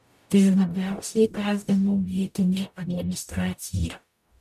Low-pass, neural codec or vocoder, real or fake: 14.4 kHz; codec, 44.1 kHz, 0.9 kbps, DAC; fake